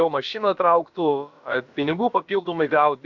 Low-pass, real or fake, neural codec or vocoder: 7.2 kHz; fake; codec, 16 kHz, about 1 kbps, DyCAST, with the encoder's durations